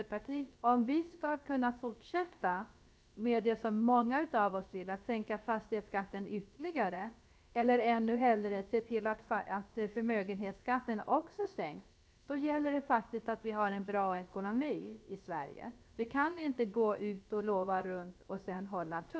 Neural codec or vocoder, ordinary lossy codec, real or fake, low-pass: codec, 16 kHz, about 1 kbps, DyCAST, with the encoder's durations; none; fake; none